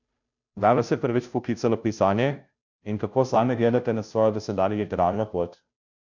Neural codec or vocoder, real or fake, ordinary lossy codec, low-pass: codec, 16 kHz, 0.5 kbps, FunCodec, trained on Chinese and English, 25 frames a second; fake; none; 7.2 kHz